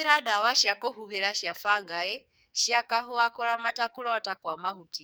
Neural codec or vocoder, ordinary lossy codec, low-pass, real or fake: codec, 44.1 kHz, 2.6 kbps, SNAC; none; none; fake